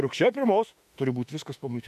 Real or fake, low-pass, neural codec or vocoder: fake; 14.4 kHz; autoencoder, 48 kHz, 32 numbers a frame, DAC-VAE, trained on Japanese speech